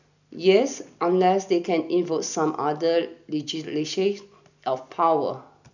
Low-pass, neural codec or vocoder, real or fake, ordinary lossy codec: 7.2 kHz; none; real; none